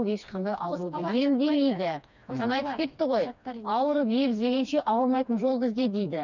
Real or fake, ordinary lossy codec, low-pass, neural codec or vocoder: fake; none; 7.2 kHz; codec, 16 kHz, 2 kbps, FreqCodec, smaller model